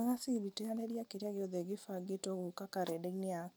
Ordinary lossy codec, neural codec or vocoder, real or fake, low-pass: none; none; real; none